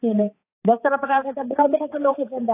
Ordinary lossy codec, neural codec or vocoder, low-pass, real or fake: AAC, 24 kbps; codec, 16 kHz, 4 kbps, X-Codec, HuBERT features, trained on balanced general audio; 3.6 kHz; fake